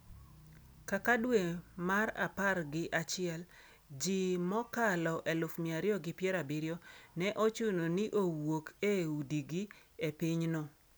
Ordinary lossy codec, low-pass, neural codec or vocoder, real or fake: none; none; none; real